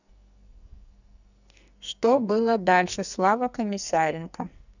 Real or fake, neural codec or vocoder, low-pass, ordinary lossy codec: fake; codec, 44.1 kHz, 2.6 kbps, SNAC; 7.2 kHz; none